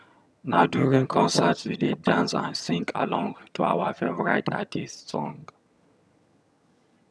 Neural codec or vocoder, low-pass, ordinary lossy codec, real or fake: vocoder, 22.05 kHz, 80 mel bands, HiFi-GAN; none; none; fake